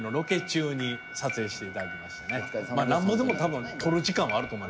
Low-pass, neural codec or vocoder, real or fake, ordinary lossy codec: none; none; real; none